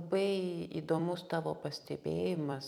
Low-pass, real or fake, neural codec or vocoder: 19.8 kHz; fake; vocoder, 44.1 kHz, 128 mel bands every 256 samples, BigVGAN v2